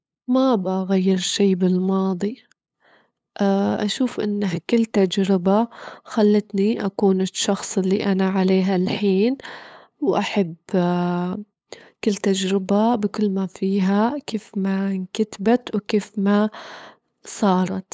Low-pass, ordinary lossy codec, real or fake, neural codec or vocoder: none; none; fake; codec, 16 kHz, 8 kbps, FunCodec, trained on LibriTTS, 25 frames a second